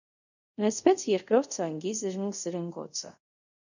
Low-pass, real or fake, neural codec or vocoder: 7.2 kHz; fake; codec, 24 kHz, 0.5 kbps, DualCodec